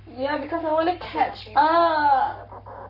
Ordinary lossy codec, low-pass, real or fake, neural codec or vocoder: none; 5.4 kHz; fake; codec, 44.1 kHz, 7.8 kbps, Pupu-Codec